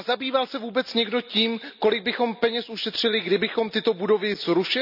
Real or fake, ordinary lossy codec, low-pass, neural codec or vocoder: real; none; 5.4 kHz; none